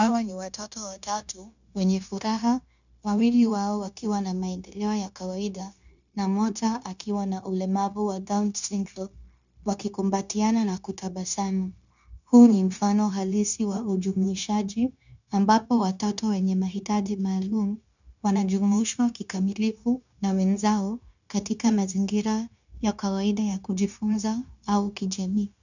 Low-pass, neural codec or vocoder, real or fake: 7.2 kHz; codec, 16 kHz, 0.9 kbps, LongCat-Audio-Codec; fake